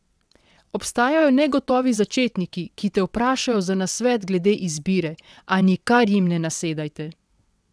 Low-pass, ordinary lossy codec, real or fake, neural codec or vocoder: none; none; fake; vocoder, 22.05 kHz, 80 mel bands, WaveNeXt